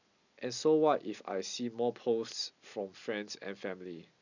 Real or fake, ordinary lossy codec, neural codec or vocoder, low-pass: real; none; none; 7.2 kHz